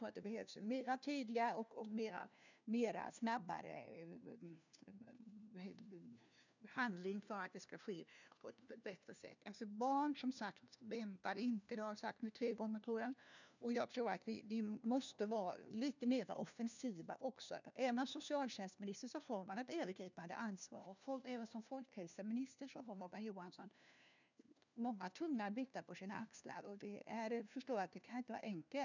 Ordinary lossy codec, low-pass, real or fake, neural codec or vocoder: none; 7.2 kHz; fake; codec, 16 kHz, 1 kbps, FunCodec, trained on LibriTTS, 50 frames a second